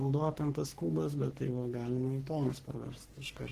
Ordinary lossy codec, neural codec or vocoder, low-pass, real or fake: Opus, 16 kbps; codec, 44.1 kHz, 3.4 kbps, Pupu-Codec; 14.4 kHz; fake